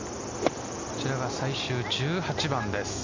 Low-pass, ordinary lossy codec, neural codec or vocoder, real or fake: 7.2 kHz; MP3, 48 kbps; none; real